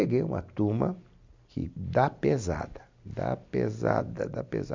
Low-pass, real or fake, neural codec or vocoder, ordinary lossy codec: 7.2 kHz; real; none; MP3, 64 kbps